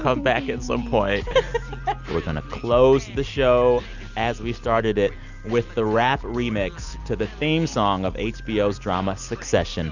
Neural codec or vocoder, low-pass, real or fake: none; 7.2 kHz; real